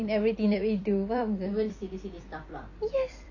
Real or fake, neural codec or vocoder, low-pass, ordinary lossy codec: fake; vocoder, 44.1 kHz, 128 mel bands every 256 samples, BigVGAN v2; 7.2 kHz; none